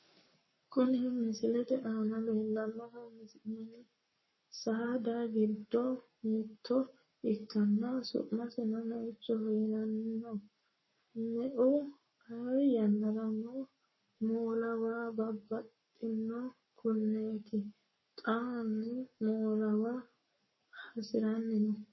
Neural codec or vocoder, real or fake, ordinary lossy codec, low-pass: codec, 44.1 kHz, 7.8 kbps, Pupu-Codec; fake; MP3, 24 kbps; 7.2 kHz